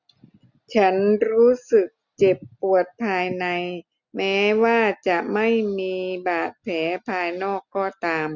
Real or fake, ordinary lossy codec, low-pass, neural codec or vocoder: real; none; 7.2 kHz; none